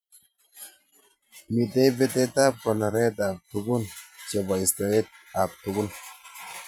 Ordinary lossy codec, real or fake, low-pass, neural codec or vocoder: none; fake; none; vocoder, 44.1 kHz, 128 mel bands every 512 samples, BigVGAN v2